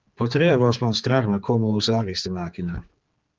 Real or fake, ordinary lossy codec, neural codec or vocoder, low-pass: fake; Opus, 16 kbps; codec, 16 kHz, 4 kbps, X-Codec, HuBERT features, trained on general audio; 7.2 kHz